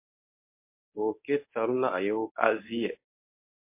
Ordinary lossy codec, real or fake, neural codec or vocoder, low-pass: MP3, 24 kbps; fake; codec, 24 kHz, 0.9 kbps, WavTokenizer, medium speech release version 1; 3.6 kHz